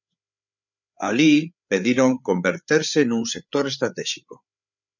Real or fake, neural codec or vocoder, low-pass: fake; codec, 16 kHz, 8 kbps, FreqCodec, larger model; 7.2 kHz